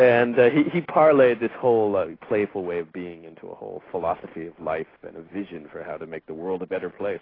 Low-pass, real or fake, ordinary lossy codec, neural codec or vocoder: 5.4 kHz; real; AAC, 24 kbps; none